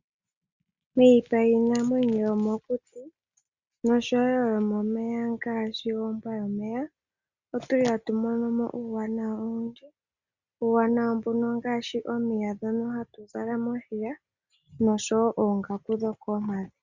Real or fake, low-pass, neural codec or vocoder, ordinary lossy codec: real; 7.2 kHz; none; Opus, 64 kbps